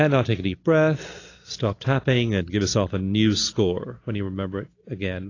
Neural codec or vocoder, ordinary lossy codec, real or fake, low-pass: none; AAC, 32 kbps; real; 7.2 kHz